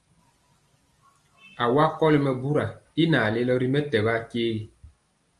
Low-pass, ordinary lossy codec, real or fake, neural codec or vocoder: 10.8 kHz; Opus, 32 kbps; real; none